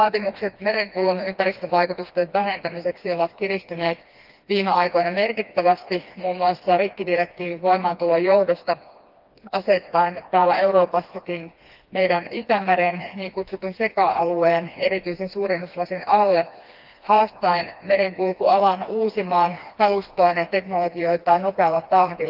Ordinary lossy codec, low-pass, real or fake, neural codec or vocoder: Opus, 32 kbps; 5.4 kHz; fake; codec, 16 kHz, 2 kbps, FreqCodec, smaller model